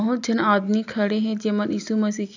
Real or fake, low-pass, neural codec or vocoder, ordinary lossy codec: real; 7.2 kHz; none; none